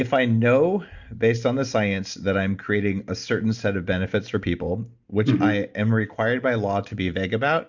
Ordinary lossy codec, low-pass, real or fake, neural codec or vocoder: Opus, 64 kbps; 7.2 kHz; real; none